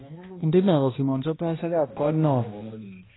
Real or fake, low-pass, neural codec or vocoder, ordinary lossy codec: fake; 7.2 kHz; codec, 16 kHz, 1 kbps, X-Codec, HuBERT features, trained on balanced general audio; AAC, 16 kbps